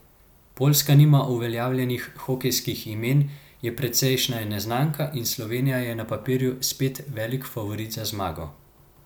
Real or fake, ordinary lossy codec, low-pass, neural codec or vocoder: real; none; none; none